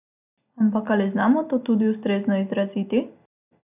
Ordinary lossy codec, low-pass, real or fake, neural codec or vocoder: none; 3.6 kHz; real; none